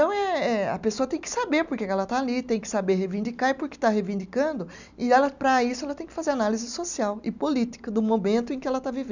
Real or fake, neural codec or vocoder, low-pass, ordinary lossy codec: real; none; 7.2 kHz; none